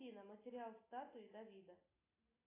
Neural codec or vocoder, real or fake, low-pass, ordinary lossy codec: none; real; 3.6 kHz; AAC, 16 kbps